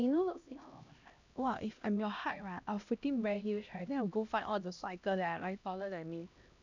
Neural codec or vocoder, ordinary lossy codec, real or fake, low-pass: codec, 16 kHz, 1 kbps, X-Codec, HuBERT features, trained on LibriSpeech; none; fake; 7.2 kHz